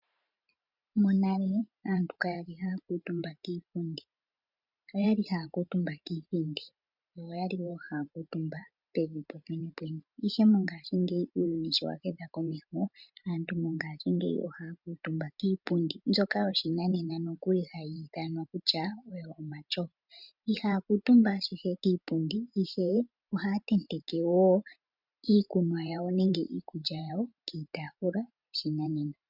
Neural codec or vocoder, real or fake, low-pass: vocoder, 44.1 kHz, 128 mel bands every 512 samples, BigVGAN v2; fake; 5.4 kHz